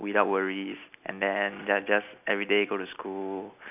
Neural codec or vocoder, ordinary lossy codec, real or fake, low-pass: none; none; real; 3.6 kHz